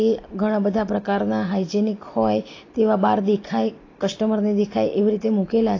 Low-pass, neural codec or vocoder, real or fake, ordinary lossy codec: 7.2 kHz; none; real; AAC, 32 kbps